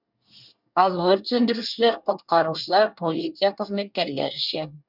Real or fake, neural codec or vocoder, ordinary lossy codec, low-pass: fake; codec, 24 kHz, 1 kbps, SNAC; none; 5.4 kHz